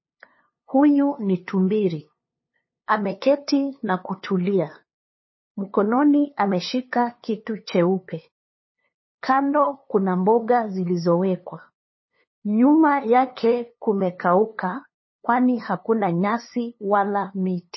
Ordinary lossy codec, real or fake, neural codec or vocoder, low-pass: MP3, 24 kbps; fake; codec, 16 kHz, 2 kbps, FunCodec, trained on LibriTTS, 25 frames a second; 7.2 kHz